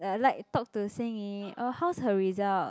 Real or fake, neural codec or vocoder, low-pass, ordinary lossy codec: real; none; none; none